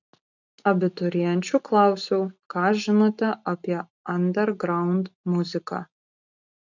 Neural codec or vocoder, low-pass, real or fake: none; 7.2 kHz; real